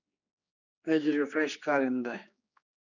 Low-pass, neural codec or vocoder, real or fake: 7.2 kHz; codec, 16 kHz, 2 kbps, X-Codec, HuBERT features, trained on general audio; fake